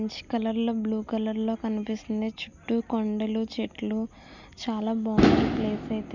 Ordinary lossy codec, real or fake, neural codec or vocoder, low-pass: none; real; none; 7.2 kHz